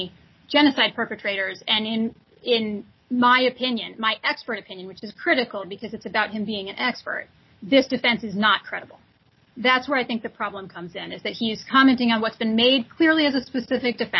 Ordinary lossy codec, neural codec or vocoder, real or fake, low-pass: MP3, 24 kbps; none; real; 7.2 kHz